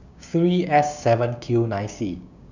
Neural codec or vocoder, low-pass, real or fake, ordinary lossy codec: codec, 16 kHz, 6 kbps, DAC; 7.2 kHz; fake; none